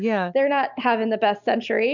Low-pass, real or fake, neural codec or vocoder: 7.2 kHz; real; none